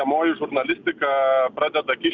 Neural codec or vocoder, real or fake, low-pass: none; real; 7.2 kHz